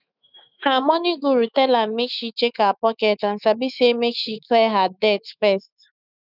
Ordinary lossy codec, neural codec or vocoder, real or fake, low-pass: none; autoencoder, 48 kHz, 128 numbers a frame, DAC-VAE, trained on Japanese speech; fake; 5.4 kHz